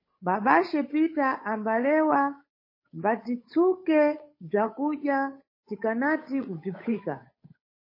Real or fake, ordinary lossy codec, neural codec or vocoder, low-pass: fake; MP3, 24 kbps; codec, 16 kHz, 8 kbps, FunCodec, trained on Chinese and English, 25 frames a second; 5.4 kHz